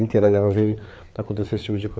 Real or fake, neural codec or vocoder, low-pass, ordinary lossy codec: fake; codec, 16 kHz, 4 kbps, FreqCodec, larger model; none; none